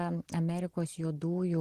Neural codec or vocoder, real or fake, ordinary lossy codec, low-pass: none; real; Opus, 16 kbps; 14.4 kHz